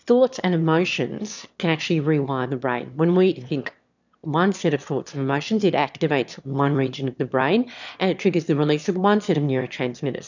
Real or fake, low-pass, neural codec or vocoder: fake; 7.2 kHz; autoencoder, 22.05 kHz, a latent of 192 numbers a frame, VITS, trained on one speaker